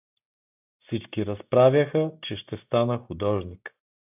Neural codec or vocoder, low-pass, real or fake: none; 3.6 kHz; real